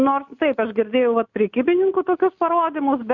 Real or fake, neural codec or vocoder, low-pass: real; none; 7.2 kHz